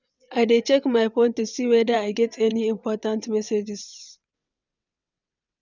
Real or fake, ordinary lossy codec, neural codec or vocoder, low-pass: fake; none; vocoder, 22.05 kHz, 80 mel bands, WaveNeXt; 7.2 kHz